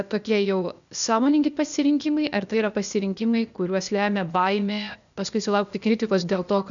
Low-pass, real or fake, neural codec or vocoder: 7.2 kHz; fake; codec, 16 kHz, 0.8 kbps, ZipCodec